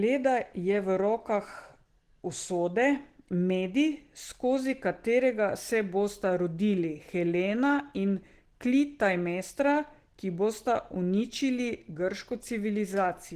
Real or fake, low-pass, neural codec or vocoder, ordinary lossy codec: real; 14.4 kHz; none; Opus, 16 kbps